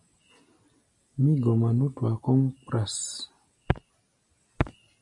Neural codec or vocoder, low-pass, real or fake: none; 10.8 kHz; real